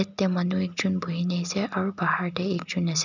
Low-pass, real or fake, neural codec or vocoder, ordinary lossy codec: 7.2 kHz; real; none; none